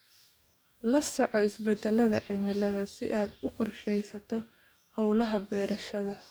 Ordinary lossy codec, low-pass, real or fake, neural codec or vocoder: none; none; fake; codec, 44.1 kHz, 2.6 kbps, DAC